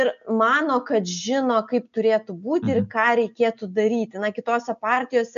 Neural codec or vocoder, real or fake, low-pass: none; real; 7.2 kHz